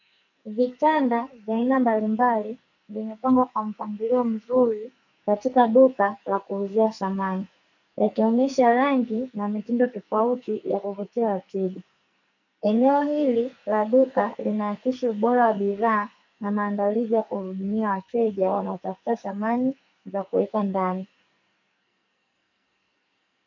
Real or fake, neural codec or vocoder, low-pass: fake; codec, 44.1 kHz, 2.6 kbps, SNAC; 7.2 kHz